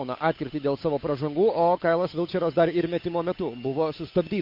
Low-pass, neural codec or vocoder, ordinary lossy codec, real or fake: 5.4 kHz; codec, 16 kHz, 8 kbps, FunCodec, trained on Chinese and English, 25 frames a second; MP3, 32 kbps; fake